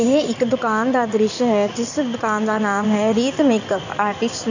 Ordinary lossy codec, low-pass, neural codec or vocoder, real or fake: none; 7.2 kHz; codec, 16 kHz in and 24 kHz out, 2.2 kbps, FireRedTTS-2 codec; fake